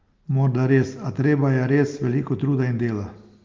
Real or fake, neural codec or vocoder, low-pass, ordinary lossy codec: real; none; 7.2 kHz; Opus, 32 kbps